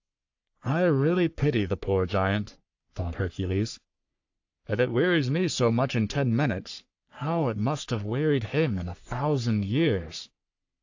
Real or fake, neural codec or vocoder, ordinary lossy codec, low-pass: fake; codec, 44.1 kHz, 3.4 kbps, Pupu-Codec; AAC, 48 kbps; 7.2 kHz